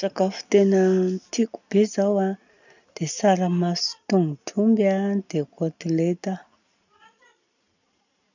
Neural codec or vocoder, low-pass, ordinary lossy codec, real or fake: none; 7.2 kHz; none; real